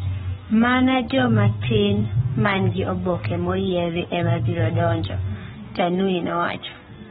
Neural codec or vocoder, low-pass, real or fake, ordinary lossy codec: codec, 44.1 kHz, 7.8 kbps, Pupu-Codec; 19.8 kHz; fake; AAC, 16 kbps